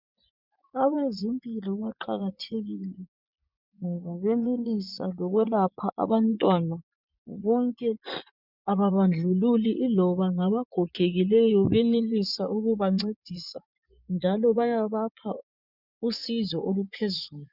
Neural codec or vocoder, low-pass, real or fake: vocoder, 24 kHz, 100 mel bands, Vocos; 5.4 kHz; fake